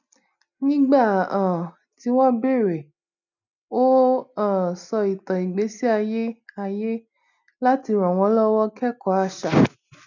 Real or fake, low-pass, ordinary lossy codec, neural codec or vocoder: real; 7.2 kHz; none; none